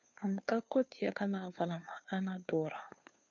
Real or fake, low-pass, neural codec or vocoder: fake; 7.2 kHz; codec, 16 kHz, 2 kbps, FunCodec, trained on Chinese and English, 25 frames a second